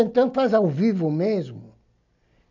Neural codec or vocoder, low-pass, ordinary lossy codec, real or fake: none; 7.2 kHz; none; real